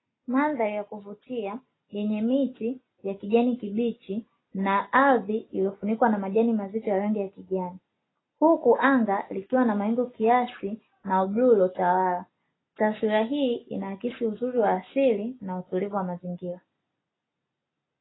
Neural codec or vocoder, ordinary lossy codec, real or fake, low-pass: none; AAC, 16 kbps; real; 7.2 kHz